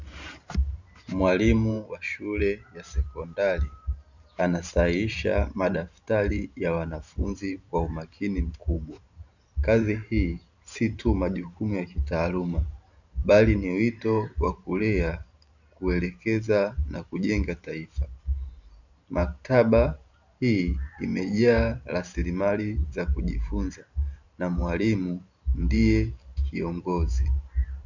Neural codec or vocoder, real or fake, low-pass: vocoder, 44.1 kHz, 128 mel bands every 512 samples, BigVGAN v2; fake; 7.2 kHz